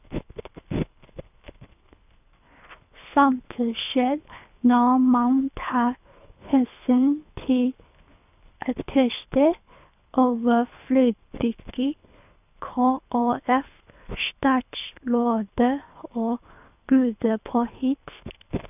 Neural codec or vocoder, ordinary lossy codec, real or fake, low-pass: codec, 24 kHz, 3 kbps, HILCodec; none; fake; 3.6 kHz